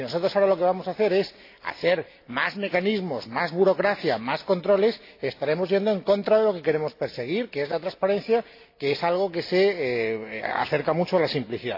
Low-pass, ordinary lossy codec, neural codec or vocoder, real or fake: 5.4 kHz; AAC, 32 kbps; none; real